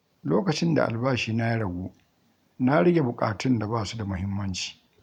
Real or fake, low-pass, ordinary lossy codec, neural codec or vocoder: fake; 19.8 kHz; none; vocoder, 44.1 kHz, 128 mel bands every 512 samples, BigVGAN v2